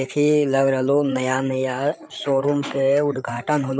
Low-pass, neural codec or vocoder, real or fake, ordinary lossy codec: none; codec, 16 kHz, 8 kbps, FreqCodec, larger model; fake; none